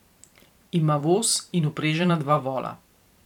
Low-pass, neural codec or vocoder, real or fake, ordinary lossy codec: 19.8 kHz; vocoder, 44.1 kHz, 128 mel bands every 512 samples, BigVGAN v2; fake; none